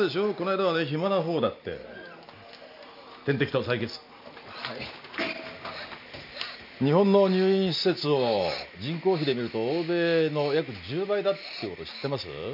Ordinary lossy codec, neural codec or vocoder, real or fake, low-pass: none; none; real; 5.4 kHz